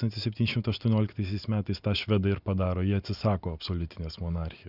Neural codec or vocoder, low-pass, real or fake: none; 5.4 kHz; real